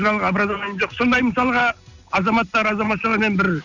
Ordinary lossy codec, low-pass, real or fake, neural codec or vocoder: none; 7.2 kHz; real; none